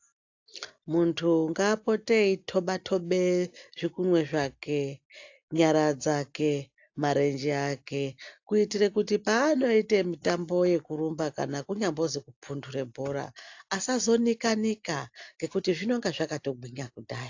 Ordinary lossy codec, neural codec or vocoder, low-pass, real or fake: AAC, 48 kbps; none; 7.2 kHz; real